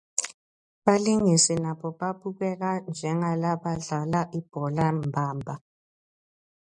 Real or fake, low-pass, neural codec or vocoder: real; 10.8 kHz; none